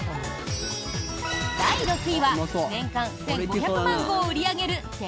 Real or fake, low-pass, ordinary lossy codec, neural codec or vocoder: real; none; none; none